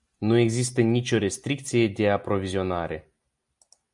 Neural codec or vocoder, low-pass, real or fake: none; 10.8 kHz; real